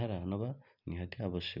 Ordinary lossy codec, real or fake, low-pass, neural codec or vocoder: none; real; 5.4 kHz; none